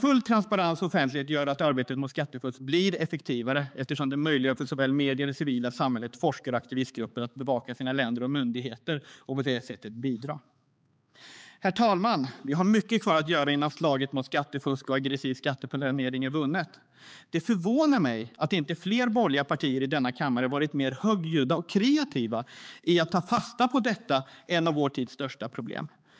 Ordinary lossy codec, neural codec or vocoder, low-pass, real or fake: none; codec, 16 kHz, 4 kbps, X-Codec, HuBERT features, trained on balanced general audio; none; fake